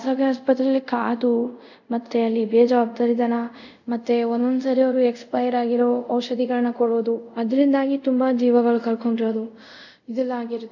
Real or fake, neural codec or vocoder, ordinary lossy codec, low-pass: fake; codec, 24 kHz, 0.5 kbps, DualCodec; none; 7.2 kHz